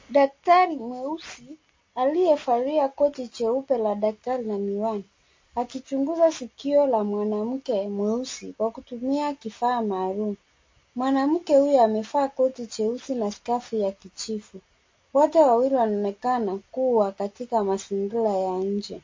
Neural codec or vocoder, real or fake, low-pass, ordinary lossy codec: none; real; 7.2 kHz; MP3, 32 kbps